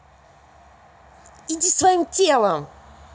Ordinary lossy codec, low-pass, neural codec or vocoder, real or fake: none; none; none; real